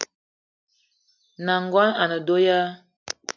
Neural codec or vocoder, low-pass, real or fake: none; 7.2 kHz; real